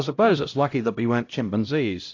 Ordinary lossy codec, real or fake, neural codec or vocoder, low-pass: AAC, 48 kbps; fake; codec, 16 kHz, 0.5 kbps, X-Codec, HuBERT features, trained on LibriSpeech; 7.2 kHz